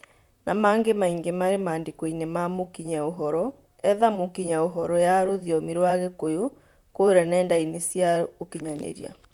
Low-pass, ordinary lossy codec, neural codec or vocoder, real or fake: 19.8 kHz; none; vocoder, 44.1 kHz, 128 mel bands, Pupu-Vocoder; fake